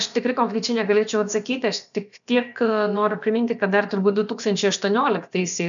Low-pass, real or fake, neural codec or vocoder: 7.2 kHz; fake; codec, 16 kHz, about 1 kbps, DyCAST, with the encoder's durations